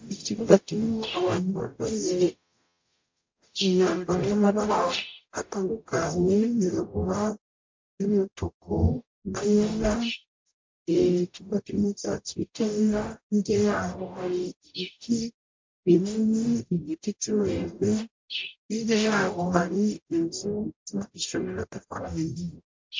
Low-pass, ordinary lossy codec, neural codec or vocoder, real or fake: 7.2 kHz; MP3, 48 kbps; codec, 44.1 kHz, 0.9 kbps, DAC; fake